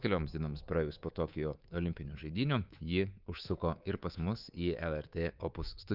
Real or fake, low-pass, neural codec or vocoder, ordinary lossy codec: fake; 5.4 kHz; codec, 24 kHz, 3.1 kbps, DualCodec; Opus, 24 kbps